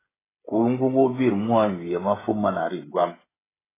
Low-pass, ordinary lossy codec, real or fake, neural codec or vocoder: 3.6 kHz; AAC, 16 kbps; fake; codec, 16 kHz, 16 kbps, FreqCodec, smaller model